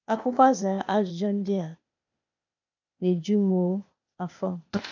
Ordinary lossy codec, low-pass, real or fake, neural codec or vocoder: none; 7.2 kHz; fake; codec, 16 kHz, 0.8 kbps, ZipCodec